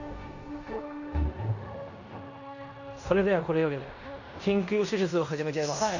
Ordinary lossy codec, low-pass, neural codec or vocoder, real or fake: none; 7.2 kHz; codec, 16 kHz in and 24 kHz out, 0.9 kbps, LongCat-Audio-Codec, fine tuned four codebook decoder; fake